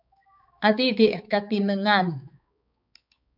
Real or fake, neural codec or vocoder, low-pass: fake; codec, 16 kHz, 4 kbps, X-Codec, HuBERT features, trained on balanced general audio; 5.4 kHz